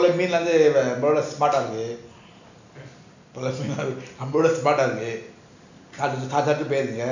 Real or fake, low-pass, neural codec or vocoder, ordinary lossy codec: real; 7.2 kHz; none; none